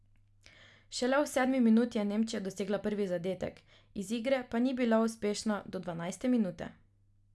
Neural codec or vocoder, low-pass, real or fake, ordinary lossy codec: none; none; real; none